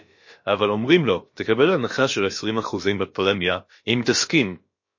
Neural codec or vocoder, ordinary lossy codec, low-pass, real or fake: codec, 16 kHz, about 1 kbps, DyCAST, with the encoder's durations; MP3, 32 kbps; 7.2 kHz; fake